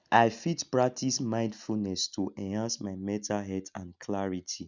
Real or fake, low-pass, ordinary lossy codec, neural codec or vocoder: real; 7.2 kHz; none; none